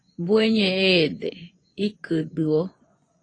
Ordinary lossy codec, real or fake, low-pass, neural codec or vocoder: AAC, 32 kbps; fake; 9.9 kHz; vocoder, 24 kHz, 100 mel bands, Vocos